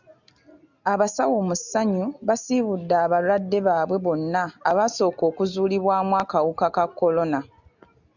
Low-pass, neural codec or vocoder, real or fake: 7.2 kHz; none; real